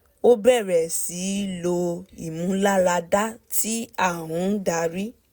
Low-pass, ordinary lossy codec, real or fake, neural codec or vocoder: none; none; real; none